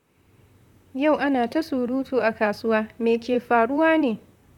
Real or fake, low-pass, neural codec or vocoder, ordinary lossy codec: fake; 19.8 kHz; vocoder, 44.1 kHz, 128 mel bands, Pupu-Vocoder; none